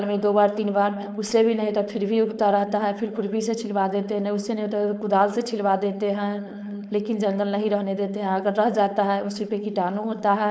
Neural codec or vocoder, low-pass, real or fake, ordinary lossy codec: codec, 16 kHz, 4.8 kbps, FACodec; none; fake; none